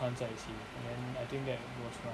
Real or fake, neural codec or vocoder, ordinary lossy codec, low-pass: real; none; none; none